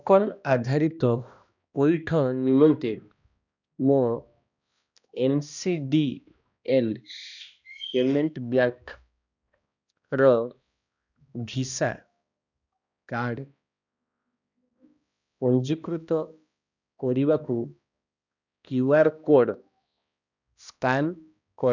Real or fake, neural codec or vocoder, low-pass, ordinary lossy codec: fake; codec, 16 kHz, 1 kbps, X-Codec, HuBERT features, trained on balanced general audio; 7.2 kHz; none